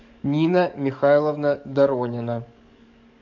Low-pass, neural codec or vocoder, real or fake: 7.2 kHz; codec, 44.1 kHz, 7.8 kbps, DAC; fake